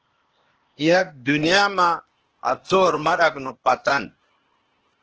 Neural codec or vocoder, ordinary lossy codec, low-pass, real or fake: codec, 16 kHz, 0.8 kbps, ZipCodec; Opus, 16 kbps; 7.2 kHz; fake